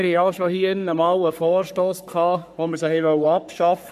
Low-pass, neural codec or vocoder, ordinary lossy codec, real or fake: 14.4 kHz; codec, 44.1 kHz, 3.4 kbps, Pupu-Codec; none; fake